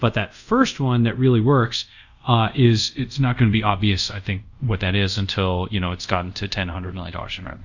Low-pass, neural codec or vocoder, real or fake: 7.2 kHz; codec, 24 kHz, 0.5 kbps, DualCodec; fake